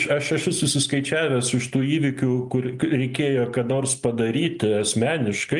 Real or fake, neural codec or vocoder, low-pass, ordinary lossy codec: real; none; 10.8 kHz; Opus, 32 kbps